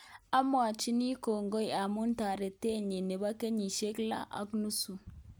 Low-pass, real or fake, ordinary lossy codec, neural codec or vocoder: none; real; none; none